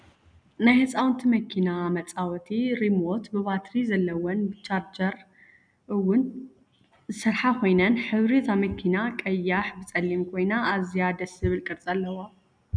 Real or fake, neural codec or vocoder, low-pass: real; none; 9.9 kHz